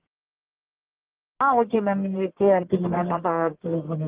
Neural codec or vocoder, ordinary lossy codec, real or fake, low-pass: codec, 44.1 kHz, 1.7 kbps, Pupu-Codec; Opus, 16 kbps; fake; 3.6 kHz